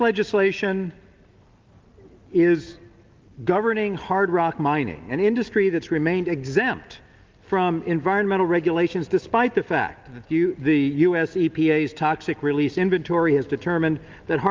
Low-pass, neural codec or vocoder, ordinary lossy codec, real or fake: 7.2 kHz; none; Opus, 32 kbps; real